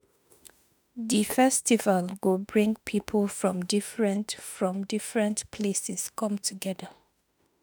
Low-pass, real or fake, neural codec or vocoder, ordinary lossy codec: none; fake; autoencoder, 48 kHz, 32 numbers a frame, DAC-VAE, trained on Japanese speech; none